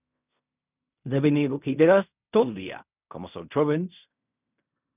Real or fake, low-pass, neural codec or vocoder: fake; 3.6 kHz; codec, 16 kHz in and 24 kHz out, 0.4 kbps, LongCat-Audio-Codec, fine tuned four codebook decoder